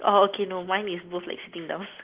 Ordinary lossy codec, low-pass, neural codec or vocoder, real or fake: Opus, 16 kbps; 3.6 kHz; none; real